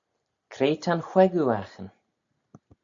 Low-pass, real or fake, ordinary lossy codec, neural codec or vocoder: 7.2 kHz; real; AAC, 64 kbps; none